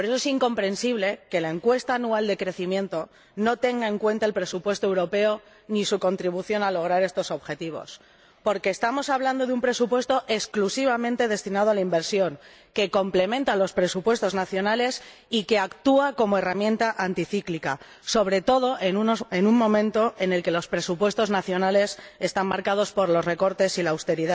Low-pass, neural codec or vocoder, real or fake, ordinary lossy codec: none; none; real; none